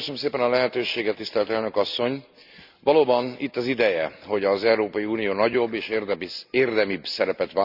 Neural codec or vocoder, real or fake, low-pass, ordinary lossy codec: none; real; 5.4 kHz; Opus, 64 kbps